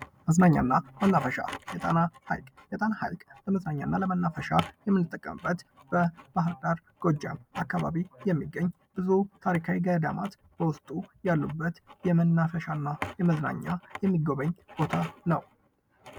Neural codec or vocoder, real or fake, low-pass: none; real; 19.8 kHz